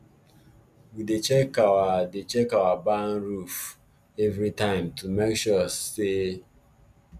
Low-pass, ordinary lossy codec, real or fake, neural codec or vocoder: 14.4 kHz; none; real; none